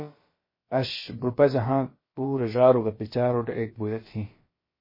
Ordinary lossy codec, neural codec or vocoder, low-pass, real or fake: MP3, 24 kbps; codec, 16 kHz, about 1 kbps, DyCAST, with the encoder's durations; 5.4 kHz; fake